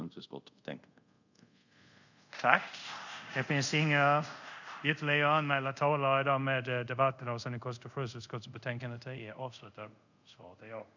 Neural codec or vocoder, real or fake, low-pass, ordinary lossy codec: codec, 24 kHz, 0.5 kbps, DualCodec; fake; 7.2 kHz; none